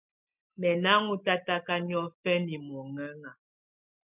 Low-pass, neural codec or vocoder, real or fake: 3.6 kHz; none; real